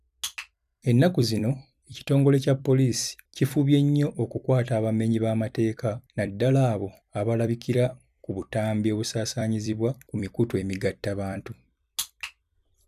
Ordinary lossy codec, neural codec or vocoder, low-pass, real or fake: none; vocoder, 44.1 kHz, 128 mel bands every 256 samples, BigVGAN v2; 14.4 kHz; fake